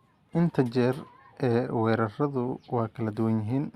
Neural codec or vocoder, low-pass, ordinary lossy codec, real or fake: none; 14.4 kHz; Opus, 64 kbps; real